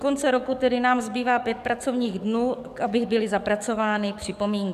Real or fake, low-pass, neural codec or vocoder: fake; 14.4 kHz; codec, 44.1 kHz, 7.8 kbps, DAC